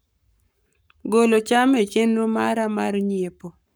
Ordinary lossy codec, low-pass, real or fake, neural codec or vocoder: none; none; fake; codec, 44.1 kHz, 7.8 kbps, Pupu-Codec